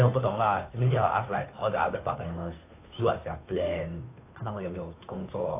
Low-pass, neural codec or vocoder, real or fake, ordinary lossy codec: 3.6 kHz; codec, 16 kHz, 2 kbps, FunCodec, trained on Chinese and English, 25 frames a second; fake; AAC, 24 kbps